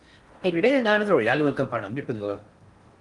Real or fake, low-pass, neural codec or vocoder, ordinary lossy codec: fake; 10.8 kHz; codec, 16 kHz in and 24 kHz out, 0.6 kbps, FocalCodec, streaming, 4096 codes; Opus, 24 kbps